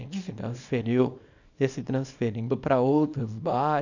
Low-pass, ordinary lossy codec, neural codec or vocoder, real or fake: 7.2 kHz; none; codec, 24 kHz, 0.9 kbps, WavTokenizer, small release; fake